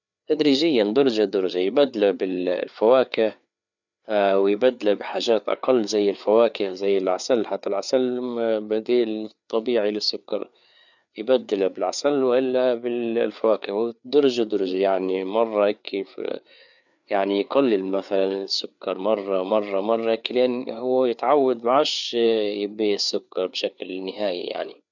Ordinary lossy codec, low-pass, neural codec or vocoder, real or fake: none; 7.2 kHz; codec, 16 kHz, 4 kbps, FreqCodec, larger model; fake